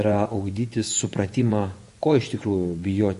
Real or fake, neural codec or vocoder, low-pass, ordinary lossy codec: fake; vocoder, 24 kHz, 100 mel bands, Vocos; 10.8 kHz; MP3, 48 kbps